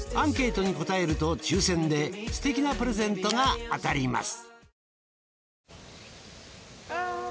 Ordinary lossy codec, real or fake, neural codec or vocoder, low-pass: none; real; none; none